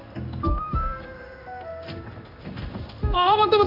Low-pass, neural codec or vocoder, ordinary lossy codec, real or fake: 5.4 kHz; codec, 16 kHz in and 24 kHz out, 1 kbps, XY-Tokenizer; none; fake